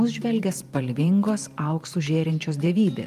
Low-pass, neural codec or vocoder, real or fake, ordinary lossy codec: 14.4 kHz; vocoder, 44.1 kHz, 128 mel bands every 512 samples, BigVGAN v2; fake; Opus, 32 kbps